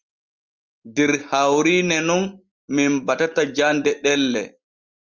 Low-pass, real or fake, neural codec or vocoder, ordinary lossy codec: 7.2 kHz; real; none; Opus, 32 kbps